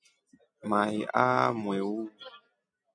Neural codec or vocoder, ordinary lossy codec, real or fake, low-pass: none; MP3, 64 kbps; real; 9.9 kHz